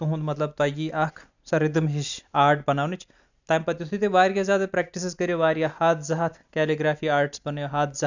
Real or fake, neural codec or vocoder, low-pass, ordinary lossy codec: real; none; 7.2 kHz; none